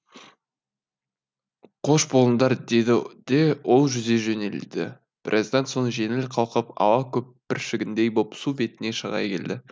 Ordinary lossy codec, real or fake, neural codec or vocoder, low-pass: none; real; none; none